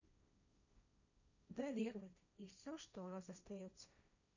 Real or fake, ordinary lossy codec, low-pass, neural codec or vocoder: fake; none; 7.2 kHz; codec, 16 kHz, 1.1 kbps, Voila-Tokenizer